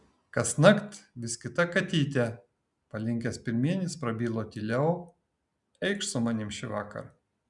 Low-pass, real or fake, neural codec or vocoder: 10.8 kHz; real; none